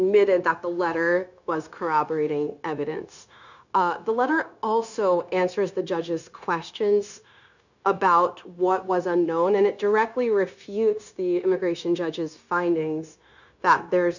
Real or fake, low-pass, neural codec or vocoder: fake; 7.2 kHz; codec, 16 kHz, 0.9 kbps, LongCat-Audio-Codec